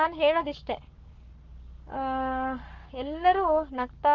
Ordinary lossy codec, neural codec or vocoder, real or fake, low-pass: Opus, 32 kbps; codec, 44.1 kHz, 7.8 kbps, Pupu-Codec; fake; 7.2 kHz